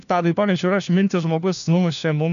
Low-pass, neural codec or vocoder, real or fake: 7.2 kHz; codec, 16 kHz, 1 kbps, FunCodec, trained on LibriTTS, 50 frames a second; fake